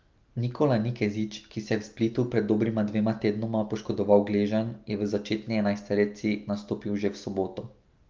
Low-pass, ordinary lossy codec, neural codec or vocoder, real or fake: 7.2 kHz; Opus, 24 kbps; none; real